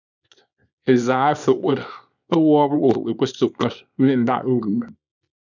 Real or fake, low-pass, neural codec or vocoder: fake; 7.2 kHz; codec, 24 kHz, 0.9 kbps, WavTokenizer, small release